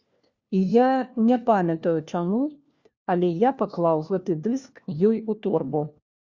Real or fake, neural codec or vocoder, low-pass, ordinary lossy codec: fake; codec, 16 kHz, 1 kbps, FunCodec, trained on LibriTTS, 50 frames a second; 7.2 kHz; Opus, 64 kbps